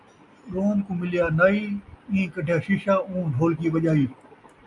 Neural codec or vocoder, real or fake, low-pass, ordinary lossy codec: none; real; 10.8 kHz; MP3, 96 kbps